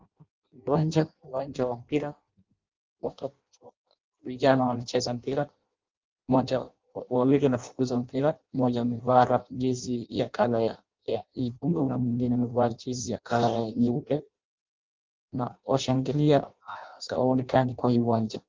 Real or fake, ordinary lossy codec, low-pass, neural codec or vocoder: fake; Opus, 32 kbps; 7.2 kHz; codec, 16 kHz in and 24 kHz out, 0.6 kbps, FireRedTTS-2 codec